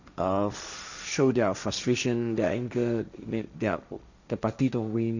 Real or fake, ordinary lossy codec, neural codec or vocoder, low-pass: fake; none; codec, 16 kHz, 1.1 kbps, Voila-Tokenizer; 7.2 kHz